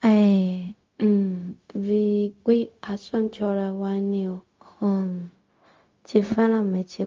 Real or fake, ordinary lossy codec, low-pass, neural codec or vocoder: fake; Opus, 32 kbps; 7.2 kHz; codec, 16 kHz, 0.4 kbps, LongCat-Audio-Codec